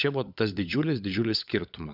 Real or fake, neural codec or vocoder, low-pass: fake; codec, 16 kHz, 16 kbps, FunCodec, trained on LibriTTS, 50 frames a second; 5.4 kHz